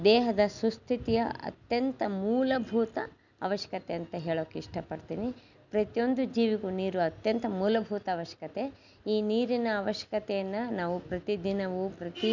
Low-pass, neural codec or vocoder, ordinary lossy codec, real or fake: 7.2 kHz; none; none; real